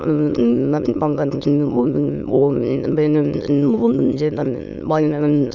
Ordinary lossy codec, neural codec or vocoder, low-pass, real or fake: none; autoencoder, 22.05 kHz, a latent of 192 numbers a frame, VITS, trained on many speakers; 7.2 kHz; fake